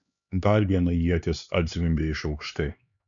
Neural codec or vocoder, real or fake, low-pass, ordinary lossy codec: codec, 16 kHz, 4 kbps, X-Codec, HuBERT features, trained on LibriSpeech; fake; 7.2 kHz; MP3, 96 kbps